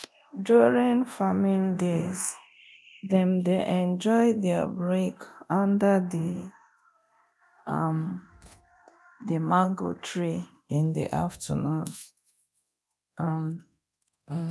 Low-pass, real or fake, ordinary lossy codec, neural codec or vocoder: none; fake; none; codec, 24 kHz, 0.9 kbps, DualCodec